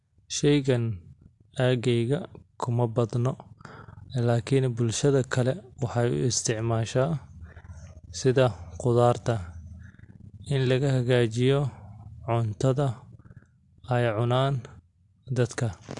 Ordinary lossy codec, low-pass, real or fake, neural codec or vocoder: AAC, 64 kbps; 10.8 kHz; real; none